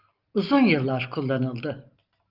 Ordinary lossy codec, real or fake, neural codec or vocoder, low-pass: Opus, 32 kbps; real; none; 5.4 kHz